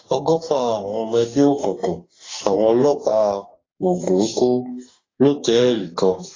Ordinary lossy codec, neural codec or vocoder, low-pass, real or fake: AAC, 32 kbps; codec, 44.1 kHz, 2.6 kbps, DAC; 7.2 kHz; fake